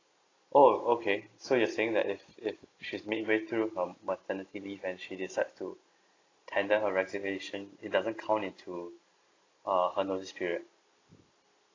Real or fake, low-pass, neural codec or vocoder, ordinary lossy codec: real; 7.2 kHz; none; AAC, 32 kbps